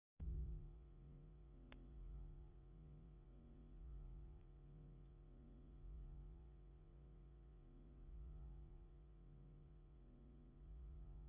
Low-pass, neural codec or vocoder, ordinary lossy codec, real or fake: 3.6 kHz; vocoder, 44.1 kHz, 80 mel bands, Vocos; Opus, 64 kbps; fake